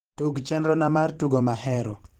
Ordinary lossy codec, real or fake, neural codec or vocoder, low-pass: Opus, 16 kbps; fake; vocoder, 44.1 kHz, 128 mel bands every 512 samples, BigVGAN v2; 19.8 kHz